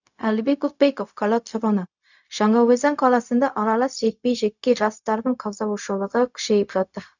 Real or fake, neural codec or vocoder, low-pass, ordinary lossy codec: fake; codec, 16 kHz, 0.4 kbps, LongCat-Audio-Codec; 7.2 kHz; none